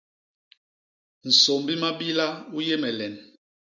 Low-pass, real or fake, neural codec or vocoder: 7.2 kHz; real; none